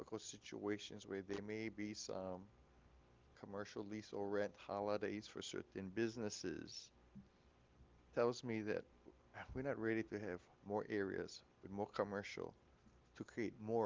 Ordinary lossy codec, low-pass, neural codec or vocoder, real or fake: Opus, 32 kbps; 7.2 kHz; none; real